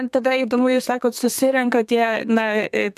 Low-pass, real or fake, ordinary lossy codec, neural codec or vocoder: 14.4 kHz; fake; AAC, 96 kbps; codec, 32 kHz, 1.9 kbps, SNAC